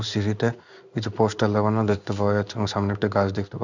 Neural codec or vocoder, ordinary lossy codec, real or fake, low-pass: codec, 16 kHz in and 24 kHz out, 1 kbps, XY-Tokenizer; none; fake; 7.2 kHz